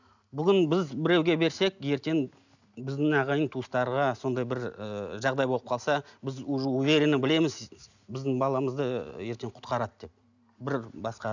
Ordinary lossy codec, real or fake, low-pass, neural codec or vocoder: none; real; 7.2 kHz; none